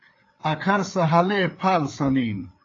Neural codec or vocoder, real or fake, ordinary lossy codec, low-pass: codec, 16 kHz, 4 kbps, FreqCodec, larger model; fake; AAC, 32 kbps; 7.2 kHz